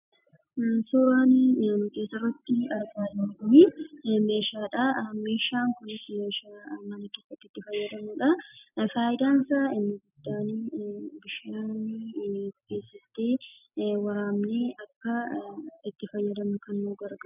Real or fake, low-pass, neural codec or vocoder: real; 3.6 kHz; none